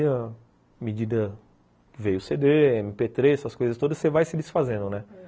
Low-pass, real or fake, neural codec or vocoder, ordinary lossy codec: none; real; none; none